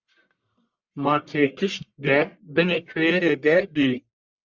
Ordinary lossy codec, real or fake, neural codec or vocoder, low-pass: Opus, 64 kbps; fake; codec, 44.1 kHz, 1.7 kbps, Pupu-Codec; 7.2 kHz